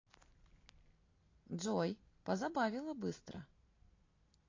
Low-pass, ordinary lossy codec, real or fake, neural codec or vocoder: 7.2 kHz; AAC, 32 kbps; real; none